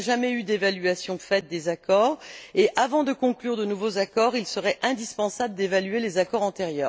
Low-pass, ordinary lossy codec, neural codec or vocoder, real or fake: none; none; none; real